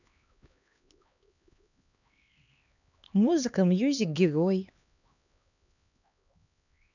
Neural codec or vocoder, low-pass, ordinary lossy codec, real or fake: codec, 16 kHz, 2 kbps, X-Codec, HuBERT features, trained on LibriSpeech; 7.2 kHz; none; fake